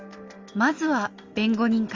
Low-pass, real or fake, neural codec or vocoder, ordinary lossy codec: 7.2 kHz; real; none; Opus, 32 kbps